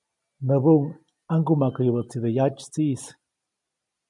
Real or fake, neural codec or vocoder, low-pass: real; none; 10.8 kHz